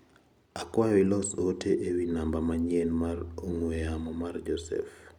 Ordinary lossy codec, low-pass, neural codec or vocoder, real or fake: none; 19.8 kHz; none; real